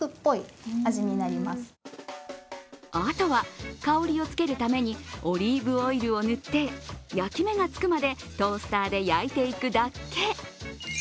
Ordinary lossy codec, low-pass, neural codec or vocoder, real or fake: none; none; none; real